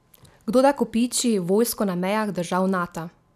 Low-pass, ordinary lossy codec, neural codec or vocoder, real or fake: 14.4 kHz; none; none; real